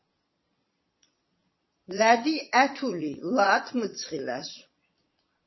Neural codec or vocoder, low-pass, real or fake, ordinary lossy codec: vocoder, 22.05 kHz, 80 mel bands, Vocos; 7.2 kHz; fake; MP3, 24 kbps